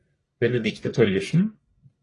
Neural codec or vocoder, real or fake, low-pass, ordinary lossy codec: codec, 44.1 kHz, 1.7 kbps, Pupu-Codec; fake; 10.8 kHz; AAC, 32 kbps